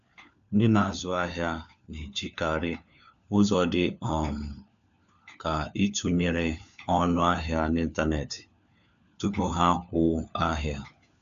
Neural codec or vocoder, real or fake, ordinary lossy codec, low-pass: codec, 16 kHz, 4 kbps, FunCodec, trained on LibriTTS, 50 frames a second; fake; AAC, 96 kbps; 7.2 kHz